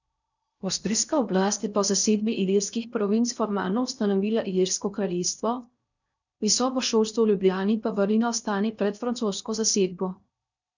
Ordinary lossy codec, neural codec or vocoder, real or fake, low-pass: none; codec, 16 kHz in and 24 kHz out, 0.6 kbps, FocalCodec, streaming, 4096 codes; fake; 7.2 kHz